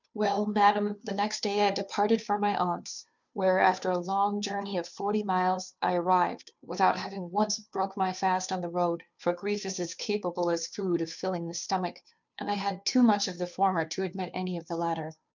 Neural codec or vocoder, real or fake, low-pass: codec, 16 kHz, 2 kbps, FunCodec, trained on Chinese and English, 25 frames a second; fake; 7.2 kHz